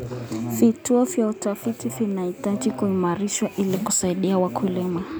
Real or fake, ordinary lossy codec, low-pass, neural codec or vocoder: real; none; none; none